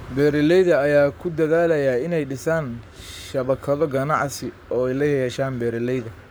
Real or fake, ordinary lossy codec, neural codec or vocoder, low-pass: fake; none; codec, 44.1 kHz, 7.8 kbps, Pupu-Codec; none